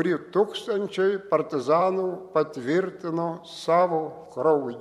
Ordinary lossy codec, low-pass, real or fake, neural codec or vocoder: MP3, 64 kbps; 19.8 kHz; fake; vocoder, 44.1 kHz, 128 mel bands every 512 samples, BigVGAN v2